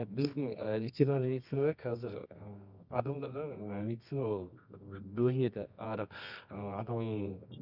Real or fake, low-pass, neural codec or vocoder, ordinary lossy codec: fake; 5.4 kHz; codec, 24 kHz, 0.9 kbps, WavTokenizer, medium music audio release; none